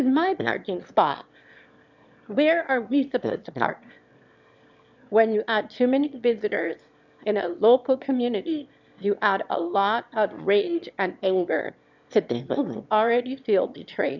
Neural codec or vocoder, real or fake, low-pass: autoencoder, 22.05 kHz, a latent of 192 numbers a frame, VITS, trained on one speaker; fake; 7.2 kHz